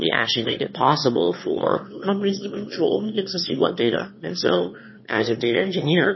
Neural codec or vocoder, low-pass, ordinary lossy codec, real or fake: autoencoder, 22.05 kHz, a latent of 192 numbers a frame, VITS, trained on one speaker; 7.2 kHz; MP3, 24 kbps; fake